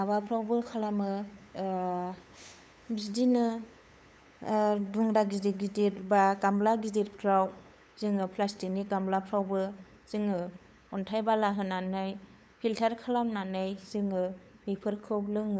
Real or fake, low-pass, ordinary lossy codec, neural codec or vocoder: fake; none; none; codec, 16 kHz, 8 kbps, FunCodec, trained on LibriTTS, 25 frames a second